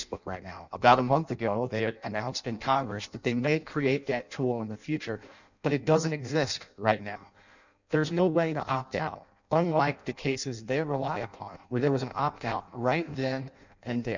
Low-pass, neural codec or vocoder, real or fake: 7.2 kHz; codec, 16 kHz in and 24 kHz out, 0.6 kbps, FireRedTTS-2 codec; fake